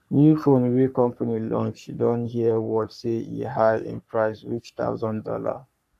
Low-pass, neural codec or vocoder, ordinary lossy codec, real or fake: 14.4 kHz; codec, 44.1 kHz, 3.4 kbps, Pupu-Codec; none; fake